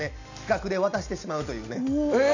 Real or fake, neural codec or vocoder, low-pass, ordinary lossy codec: real; none; 7.2 kHz; none